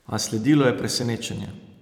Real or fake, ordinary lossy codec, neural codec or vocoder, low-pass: fake; none; vocoder, 44.1 kHz, 128 mel bands every 256 samples, BigVGAN v2; 19.8 kHz